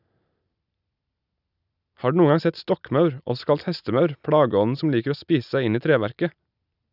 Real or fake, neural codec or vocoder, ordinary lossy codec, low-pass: real; none; none; 5.4 kHz